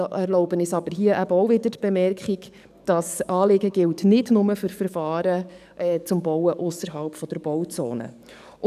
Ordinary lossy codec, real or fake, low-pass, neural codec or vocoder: none; fake; 14.4 kHz; codec, 44.1 kHz, 7.8 kbps, DAC